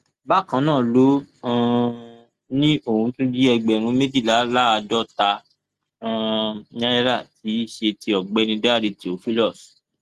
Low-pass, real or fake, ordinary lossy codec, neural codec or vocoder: 14.4 kHz; real; Opus, 24 kbps; none